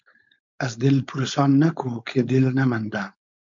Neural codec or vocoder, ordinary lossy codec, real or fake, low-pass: codec, 16 kHz, 4.8 kbps, FACodec; MP3, 64 kbps; fake; 7.2 kHz